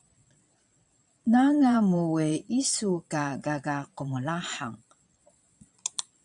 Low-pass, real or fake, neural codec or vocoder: 9.9 kHz; fake; vocoder, 22.05 kHz, 80 mel bands, Vocos